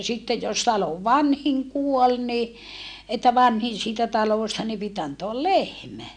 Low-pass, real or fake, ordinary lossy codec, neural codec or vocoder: 9.9 kHz; real; none; none